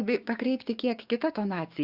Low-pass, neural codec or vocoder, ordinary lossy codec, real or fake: 5.4 kHz; codec, 16 kHz, 6 kbps, DAC; Opus, 64 kbps; fake